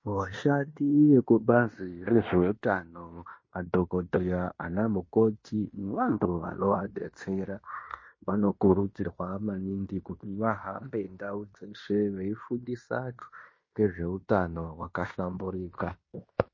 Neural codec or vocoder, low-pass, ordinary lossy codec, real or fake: codec, 16 kHz in and 24 kHz out, 0.9 kbps, LongCat-Audio-Codec, fine tuned four codebook decoder; 7.2 kHz; MP3, 32 kbps; fake